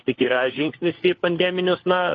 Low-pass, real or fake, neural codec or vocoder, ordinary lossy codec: 7.2 kHz; fake; codec, 16 kHz, 4 kbps, FunCodec, trained on LibriTTS, 50 frames a second; AAC, 32 kbps